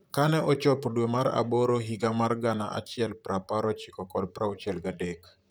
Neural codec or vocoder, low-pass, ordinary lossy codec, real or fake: vocoder, 44.1 kHz, 128 mel bands, Pupu-Vocoder; none; none; fake